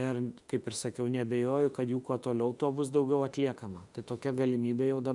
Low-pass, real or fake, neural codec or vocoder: 10.8 kHz; fake; autoencoder, 48 kHz, 32 numbers a frame, DAC-VAE, trained on Japanese speech